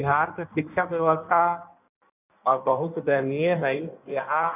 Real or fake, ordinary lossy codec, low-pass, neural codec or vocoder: fake; none; 3.6 kHz; codec, 16 kHz in and 24 kHz out, 1.1 kbps, FireRedTTS-2 codec